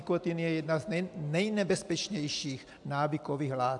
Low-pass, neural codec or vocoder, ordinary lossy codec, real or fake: 10.8 kHz; none; AAC, 64 kbps; real